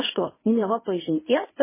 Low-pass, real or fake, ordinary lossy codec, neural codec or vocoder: 3.6 kHz; fake; MP3, 16 kbps; codec, 24 kHz, 0.9 kbps, WavTokenizer, medium speech release version 1